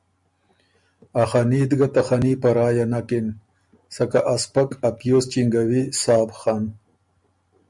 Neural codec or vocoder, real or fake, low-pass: none; real; 10.8 kHz